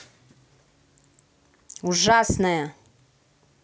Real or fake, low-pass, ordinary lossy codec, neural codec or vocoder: real; none; none; none